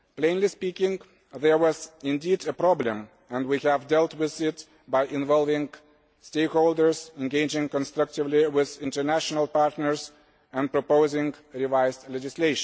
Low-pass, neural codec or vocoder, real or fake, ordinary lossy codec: none; none; real; none